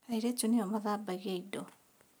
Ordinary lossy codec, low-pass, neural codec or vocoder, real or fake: none; none; none; real